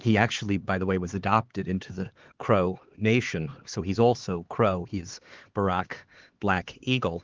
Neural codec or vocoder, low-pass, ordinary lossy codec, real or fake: codec, 16 kHz, 4 kbps, X-Codec, HuBERT features, trained on LibriSpeech; 7.2 kHz; Opus, 16 kbps; fake